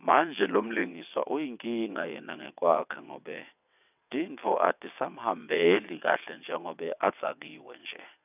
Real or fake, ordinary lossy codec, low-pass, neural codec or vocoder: fake; none; 3.6 kHz; vocoder, 22.05 kHz, 80 mel bands, WaveNeXt